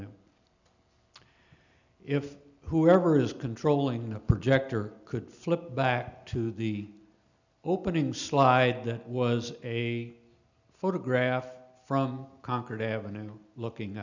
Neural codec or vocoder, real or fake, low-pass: none; real; 7.2 kHz